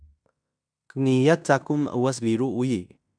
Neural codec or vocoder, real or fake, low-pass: codec, 16 kHz in and 24 kHz out, 0.9 kbps, LongCat-Audio-Codec, fine tuned four codebook decoder; fake; 9.9 kHz